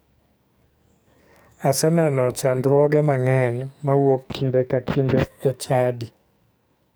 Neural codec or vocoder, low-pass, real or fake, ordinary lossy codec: codec, 44.1 kHz, 2.6 kbps, SNAC; none; fake; none